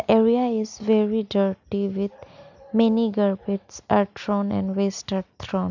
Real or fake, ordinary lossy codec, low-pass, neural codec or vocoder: real; none; 7.2 kHz; none